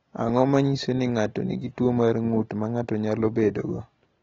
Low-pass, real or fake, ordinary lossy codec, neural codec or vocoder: 7.2 kHz; real; AAC, 24 kbps; none